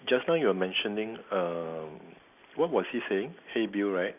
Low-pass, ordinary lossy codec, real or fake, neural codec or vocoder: 3.6 kHz; none; real; none